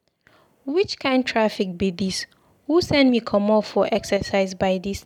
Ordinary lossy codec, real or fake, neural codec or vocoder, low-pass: none; real; none; 19.8 kHz